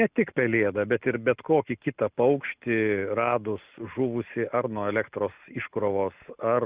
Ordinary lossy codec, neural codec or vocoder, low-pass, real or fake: Opus, 64 kbps; none; 3.6 kHz; real